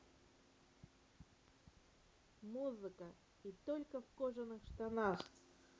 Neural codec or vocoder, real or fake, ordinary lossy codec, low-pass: none; real; none; none